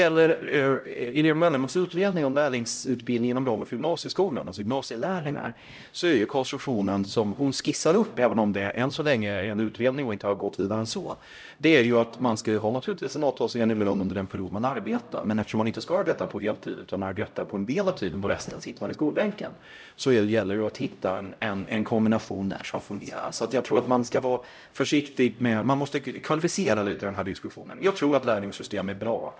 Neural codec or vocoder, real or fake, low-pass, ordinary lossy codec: codec, 16 kHz, 0.5 kbps, X-Codec, HuBERT features, trained on LibriSpeech; fake; none; none